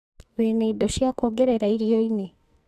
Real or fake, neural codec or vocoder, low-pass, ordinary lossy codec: fake; codec, 44.1 kHz, 2.6 kbps, SNAC; 14.4 kHz; none